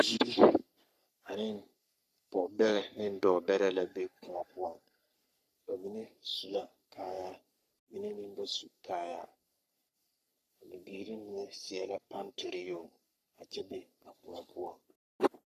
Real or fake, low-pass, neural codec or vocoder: fake; 14.4 kHz; codec, 44.1 kHz, 3.4 kbps, Pupu-Codec